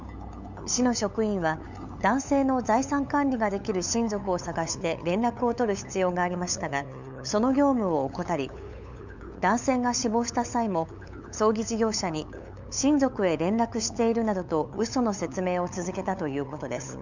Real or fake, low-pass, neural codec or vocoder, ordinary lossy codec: fake; 7.2 kHz; codec, 16 kHz, 8 kbps, FunCodec, trained on LibriTTS, 25 frames a second; none